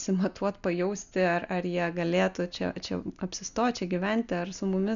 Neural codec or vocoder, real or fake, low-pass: none; real; 7.2 kHz